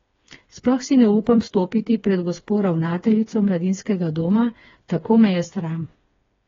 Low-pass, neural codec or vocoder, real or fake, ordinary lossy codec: 7.2 kHz; codec, 16 kHz, 4 kbps, FreqCodec, smaller model; fake; AAC, 24 kbps